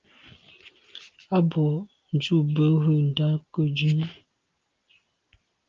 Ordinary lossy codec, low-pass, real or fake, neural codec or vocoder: Opus, 32 kbps; 7.2 kHz; real; none